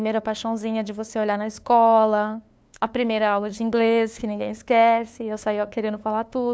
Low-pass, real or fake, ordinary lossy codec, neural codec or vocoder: none; fake; none; codec, 16 kHz, 2 kbps, FunCodec, trained on LibriTTS, 25 frames a second